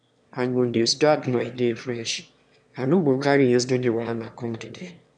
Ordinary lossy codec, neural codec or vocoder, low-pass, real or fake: none; autoencoder, 22.05 kHz, a latent of 192 numbers a frame, VITS, trained on one speaker; 9.9 kHz; fake